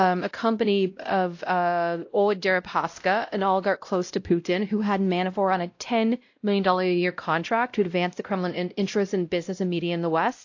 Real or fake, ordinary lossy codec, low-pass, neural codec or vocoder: fake; AAC, 48 kbps; 7.2 kHz; codec, 16 kHz, 0.5 kbps, X-Codec, WavLM features, trained on Multilingual LibriSpeech